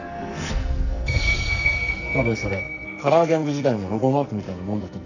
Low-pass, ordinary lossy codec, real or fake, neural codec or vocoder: 7.2 kHz; none; fake; codec, 44.1 kHz, 2.6 kbps, SNAC